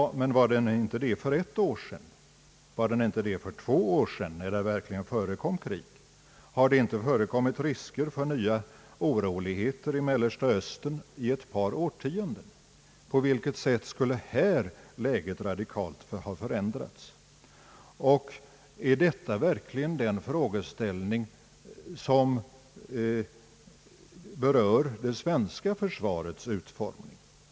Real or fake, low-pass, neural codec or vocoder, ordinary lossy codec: real; none; none; none